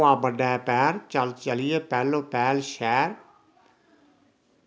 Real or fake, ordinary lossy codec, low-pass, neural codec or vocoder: real; none; none; none